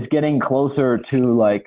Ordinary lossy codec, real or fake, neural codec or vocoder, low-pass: Opus, 16 kbps; real; none; 3.6 kHz